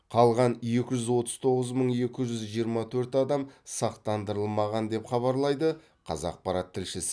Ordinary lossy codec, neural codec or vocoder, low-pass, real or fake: none; none; none; real